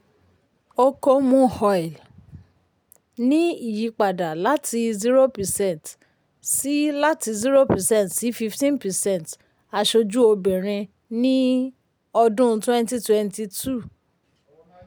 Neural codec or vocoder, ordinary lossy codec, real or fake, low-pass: none; none; real; none